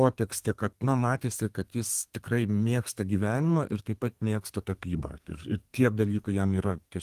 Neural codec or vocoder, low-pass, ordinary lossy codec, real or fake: codec, 32 kHz, 1.9 kbps, SNAC; 14.4 kHz; Opus, 24 kbps; fake